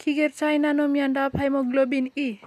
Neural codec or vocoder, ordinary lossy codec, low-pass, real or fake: none; none; 14.4 kHz; real